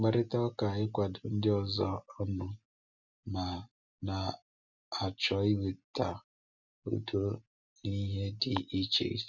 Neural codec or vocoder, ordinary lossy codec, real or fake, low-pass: none; none; real; 7.2 kHz